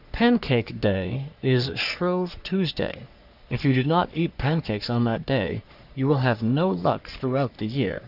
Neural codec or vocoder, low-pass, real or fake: codec, 44.1 kHz, 3.4 kbps, Pupu-Codec; 5.4 kHz; fake